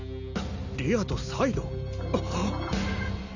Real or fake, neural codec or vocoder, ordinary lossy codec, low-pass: real; none; none; 7.2 kHz